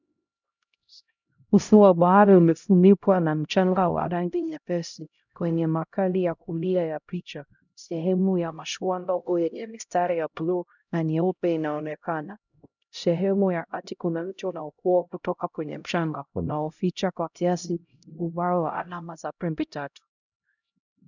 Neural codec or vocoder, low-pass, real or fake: codec, 16 kHz, 0.5 kbps, X-Codec, HuBERT features, trained on LibriSpeech; 7.2 kHz; fake